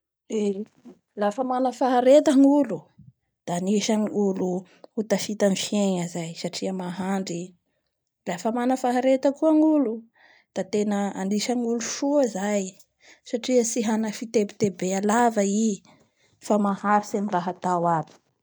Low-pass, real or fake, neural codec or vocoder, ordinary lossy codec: none; real; none; none